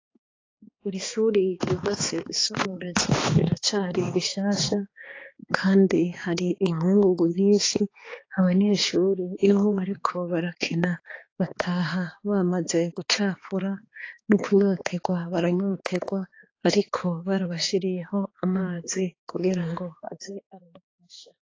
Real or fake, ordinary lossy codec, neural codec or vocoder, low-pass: fake; AAC, 32 kbps; codec, 16 kHz, 2 kbps, X-Codec, HuBERT features, trained on balanced general audio; 7.2 kHz